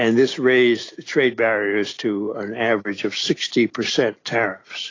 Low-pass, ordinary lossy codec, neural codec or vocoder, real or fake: 7.2 kHz; AAC, 32 kbps; none; real